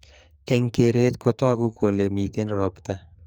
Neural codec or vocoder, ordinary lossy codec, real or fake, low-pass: codec, 44.1 kHz, 2.6 kbps, SNAC; none; fake; none